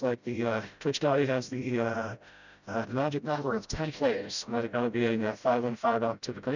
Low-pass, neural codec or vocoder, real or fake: 7.2 kHz; codec, 16 kHz, 0.5 kbps, FreqCodec, smaller model; fake